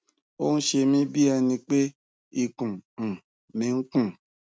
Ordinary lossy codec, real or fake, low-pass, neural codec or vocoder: none; real; none; none